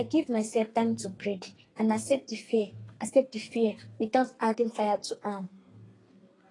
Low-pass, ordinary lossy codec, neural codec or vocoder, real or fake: 10.8 kHz; AAC, 32 kbps; codec, 32 kHz, 1.9 kbps, SNAC; fake